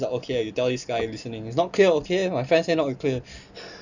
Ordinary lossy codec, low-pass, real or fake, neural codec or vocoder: none; 7.2 kHz; real; none